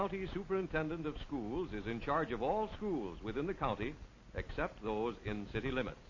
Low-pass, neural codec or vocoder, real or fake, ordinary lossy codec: 7.2 kHz; none; real; MP3, 32 kbps